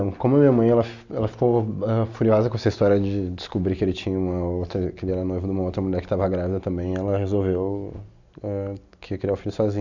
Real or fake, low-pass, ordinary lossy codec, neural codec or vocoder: real; 7.2 kHz; none; none